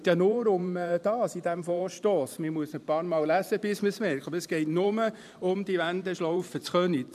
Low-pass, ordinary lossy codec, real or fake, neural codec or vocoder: 14.4 kHz; none; fake; vocoder, 44.1 kHz, 128 mel bands every 512 samples, BigVGAN v2